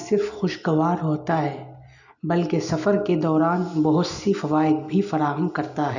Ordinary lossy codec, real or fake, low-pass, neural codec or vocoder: none; real; 7.2 kHz; none